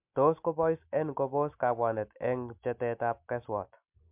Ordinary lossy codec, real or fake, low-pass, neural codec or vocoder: MP3, 32 kbps; real; 3.6 kHz; none